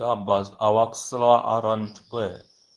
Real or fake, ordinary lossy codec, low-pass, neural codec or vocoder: fake; Opus, 24 kbps; 10.8 kHz; codec, 24 kHz, 0.9 kbps, WavTokenizer, medium speech release version 2